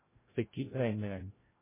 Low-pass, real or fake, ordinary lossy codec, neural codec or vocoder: 3.6 kHz; fake; MP3, 16 kbps; codec, 16 kHz, 0.5 kbps, FreqCodec, larger model